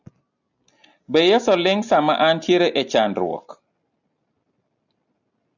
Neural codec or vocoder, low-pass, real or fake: none; 7.2 kHz; real